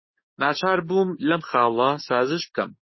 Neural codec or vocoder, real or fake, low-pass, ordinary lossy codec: codec, 16 kHz, 6 kbps, DAC; fake; 7.2 kHz; MP3, 24 kbps